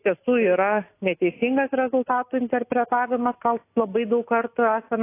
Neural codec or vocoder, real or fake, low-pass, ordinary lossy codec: none; real; 3.6 kHz; AAC, 24 kbps